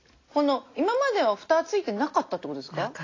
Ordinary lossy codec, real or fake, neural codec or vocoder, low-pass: AAC, 32 kbps; real; none; 7.2 kHz